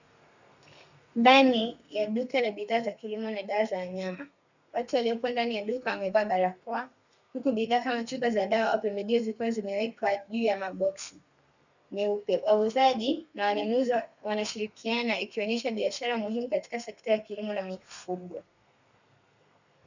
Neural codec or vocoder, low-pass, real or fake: codec, 32 kHz, 1.9 kbps, SNAC; 7.2 kHz; fake